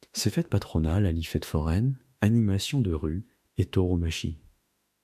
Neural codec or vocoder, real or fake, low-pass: autoencoder, 48 kHz, 32 numbers a frame, DAC-VAE, trained on Japanese speech; fake; 14.4 kHz